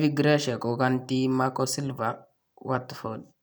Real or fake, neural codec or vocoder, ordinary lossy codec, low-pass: fake; vocoder, 44.1 kHz, 128 mel bands every 256 samples, BigVGAN v2; none; none